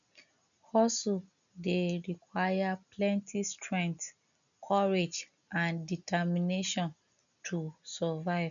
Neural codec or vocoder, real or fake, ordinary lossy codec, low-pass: none; real; none; 7.2 kHz